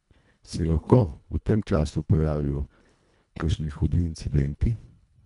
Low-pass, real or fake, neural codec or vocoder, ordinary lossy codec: 10.8 kHz; fake; codec, 24 kHz, 1.5 kbps, HILCodec; none